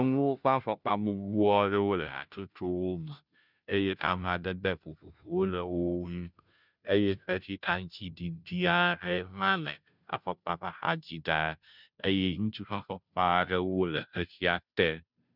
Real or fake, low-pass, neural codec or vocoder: fake; 5.4 kHz; codec, 16 kHz, 0.5 kbps, FunCodec, trained on Chinese and English, 25 frames a second